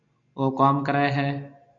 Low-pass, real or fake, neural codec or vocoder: 7.2 kHz; real; none